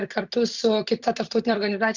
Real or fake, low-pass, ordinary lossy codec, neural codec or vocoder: fake; 7.2 kHz; Opus, 64 kbps; vocoder, 44.1 kHz, 128 mel bands every 256 samples, BigVGAN v2